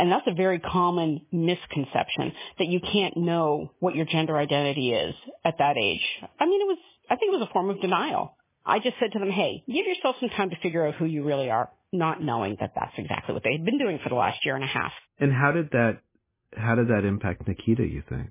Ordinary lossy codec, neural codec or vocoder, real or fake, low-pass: MP3, 16 kbps; none; real; 3.6 kHz